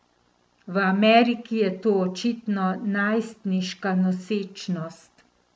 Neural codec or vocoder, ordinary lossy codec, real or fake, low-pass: none; none; real; none